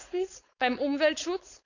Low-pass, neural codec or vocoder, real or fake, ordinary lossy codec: 7.2 kHz; codec, 16 kHz, 4.8 kbps, FACodec; fake; none